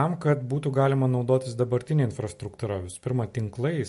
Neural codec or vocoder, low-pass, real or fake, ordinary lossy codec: none; 14.4 kHz; real; MP3, 48 kbps